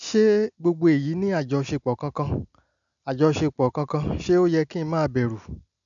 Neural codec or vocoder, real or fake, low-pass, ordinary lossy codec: none; real; 7.2 kHz; none